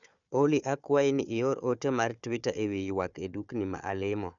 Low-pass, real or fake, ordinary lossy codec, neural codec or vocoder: 7.2 kHz; fake; none; codec, 16 kHz, 4 kbps, FunCodec, trained on Chinese and English, 50 frames a second